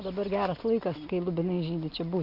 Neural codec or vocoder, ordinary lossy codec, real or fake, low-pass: none; Opus, 64 kbps; real; 5.4 kHz